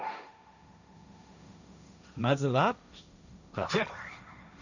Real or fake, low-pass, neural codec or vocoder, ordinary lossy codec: fake; 7.2 kHz; codec, 16 kHz, 1.1 kbps, Voila-Tokenizer; none